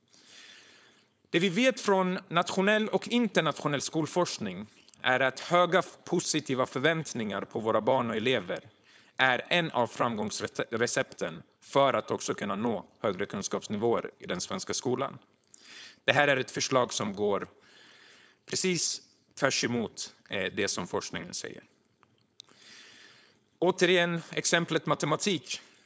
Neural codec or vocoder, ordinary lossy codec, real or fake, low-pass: codec, 16 kHz, 4.8 kbps, FACodec; none; fake; none